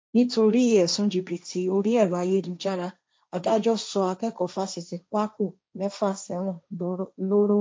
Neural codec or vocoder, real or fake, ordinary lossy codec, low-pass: codec, 16 kHz, 1.1 kbps, Voila-Tokenizer; fake; none; none